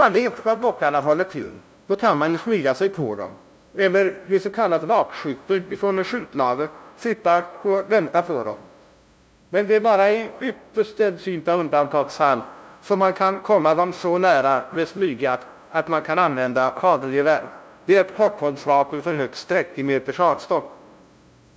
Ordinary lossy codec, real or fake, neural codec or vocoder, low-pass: none; fake; codec, 16 kHz, 0.5 kbps, FunCodec, trained on LibriTTS, 25 frames a second; none